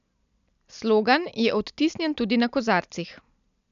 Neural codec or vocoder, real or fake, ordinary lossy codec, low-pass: none; real; none; 7.2 kHz